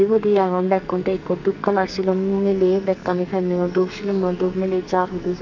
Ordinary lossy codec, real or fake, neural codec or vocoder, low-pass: none; fake; codec, 44.1 kHz, 2.6 kbps, SNAC; 7.2 kHz